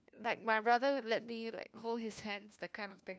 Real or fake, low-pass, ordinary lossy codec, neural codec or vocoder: fake; none; none; codec, 16 kHz, 1 kbps, FunCodec, trained on LibriTTS, 50 frames a second